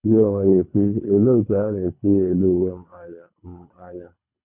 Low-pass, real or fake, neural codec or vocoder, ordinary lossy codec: 3.6 kHz; fake; codec, 24 kHz, 3 kbps, HILCodec; none